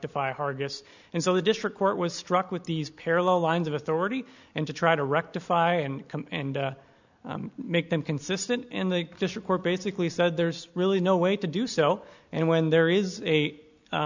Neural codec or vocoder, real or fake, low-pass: none; real; 7.2 kHz